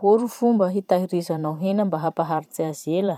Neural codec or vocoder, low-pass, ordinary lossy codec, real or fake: vocoder, 44.1 kHz, 128 mel bands every 512 samples, BigVGAN v2; 19.8 kHz; none; fake